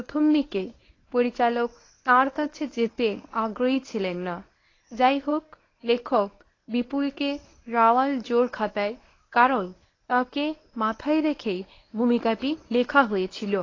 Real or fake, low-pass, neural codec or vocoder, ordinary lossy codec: fake; 7.2 kHz; codec, 24 kHz, 0.9 kbps, WavTokenizer, medium speech release version 1; AAC, 32 kbps